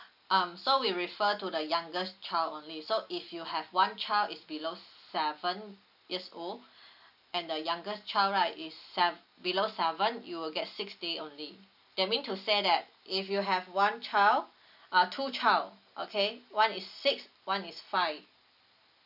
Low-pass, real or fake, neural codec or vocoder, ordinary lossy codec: 5.4 kHz; real; none; none